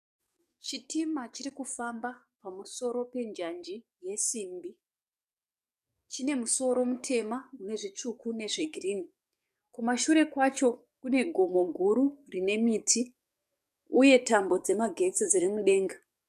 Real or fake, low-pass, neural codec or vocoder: fake; 14.4 kHz; codec, 44.1 kHz, 7.8 kbps, DAC